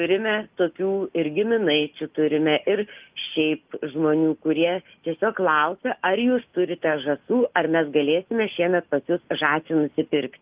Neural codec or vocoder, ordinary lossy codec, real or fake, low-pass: none; Opus, 32 kbps; real; 3.6 kHz